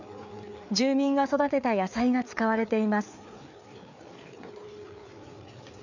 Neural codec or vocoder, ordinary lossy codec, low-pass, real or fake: codec, 16 kHz, 4 kbps, FreqCodec, larger model; none; 7.2 kHz; fake